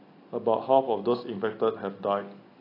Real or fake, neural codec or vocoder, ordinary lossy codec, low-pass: real; none; AAC, 32 kbps; 5.4 kHz